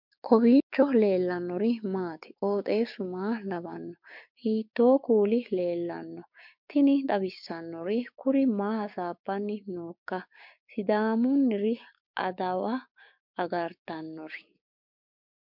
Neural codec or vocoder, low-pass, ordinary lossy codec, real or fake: codec, 16 kHz, 6 kbps, DAC; 5.4 kHz; MP3, 48 kbps; fake